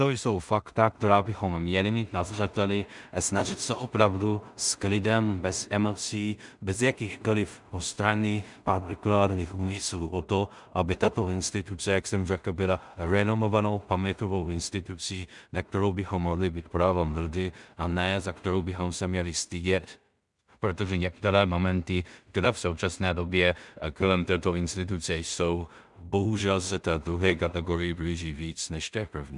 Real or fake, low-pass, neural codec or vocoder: fake; 10.8 kHz; codec, 16 kHz in and 24 kHz out, 0.4 kbps, LongCat-Audio-Codec, two codebook decoder